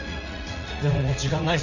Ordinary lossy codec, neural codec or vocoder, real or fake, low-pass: none; vocoder, 44.1 kHz, 80 mel bands, Vocos; fake; 7.2 kHz